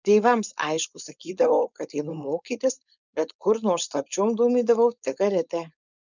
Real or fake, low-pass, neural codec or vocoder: fake; 7.2 kHz; codec, 16 kHz, 4.8 kbps, FACodec